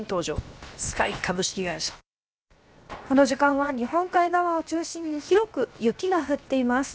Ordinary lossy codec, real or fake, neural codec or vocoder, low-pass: none; fake; codec, 16 kHz, 0.7 kbps, FocalCodec; none